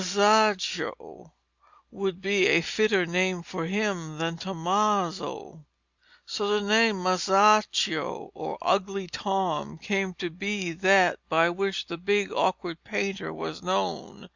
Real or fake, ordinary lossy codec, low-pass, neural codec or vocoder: real; Opus, 64 kbps; 7.2 kHz; none